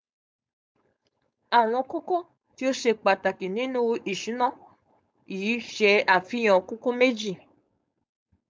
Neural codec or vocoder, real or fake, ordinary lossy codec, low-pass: codec, 16 kHz, 4.8 kbps, FACodec; fake; none; none